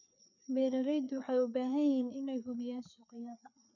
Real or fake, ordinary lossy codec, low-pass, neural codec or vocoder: fake; none; 7.2 kHz; codec, 16 kHz, 4 kbps, FreqCodec, larger model